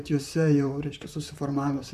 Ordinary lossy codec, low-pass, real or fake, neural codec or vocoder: MP3, 96 kbps; 14.4 kHz; fake; vocoder, 44.1 kHz, 128 mel bands, Pupu-Vocoder